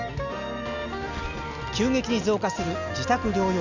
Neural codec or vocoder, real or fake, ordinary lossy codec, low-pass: none; real; none; 7.2 kHz